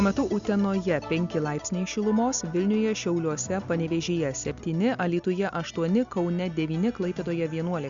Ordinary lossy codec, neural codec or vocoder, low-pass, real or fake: Opus, 64 kbps; none; 7.2 kHz; real